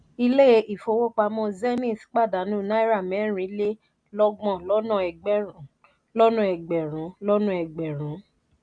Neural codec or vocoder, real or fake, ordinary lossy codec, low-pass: none; real; none; 9.9 kHz